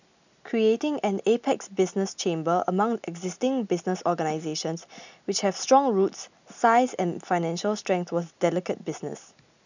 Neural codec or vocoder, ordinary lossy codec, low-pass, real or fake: none; none; 7.2 kHz; real